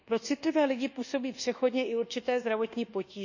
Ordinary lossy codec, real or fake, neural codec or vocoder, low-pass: none; fake; codec, 24 kHz, 1.2 kbps, DualCodec; 7.2 kHz